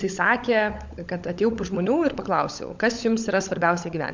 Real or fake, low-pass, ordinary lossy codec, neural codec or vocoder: fake; 7.2 kHz; MP3, 64 kbps; codec, 16 kHz, 16 kbps, FunCodec, trained on LibriTTS, 50 frames a second